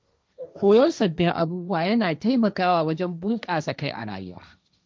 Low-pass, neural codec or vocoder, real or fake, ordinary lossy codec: none; codec, 16 kHz, 1.1 kbps, Voila-Tokenizer; fake; none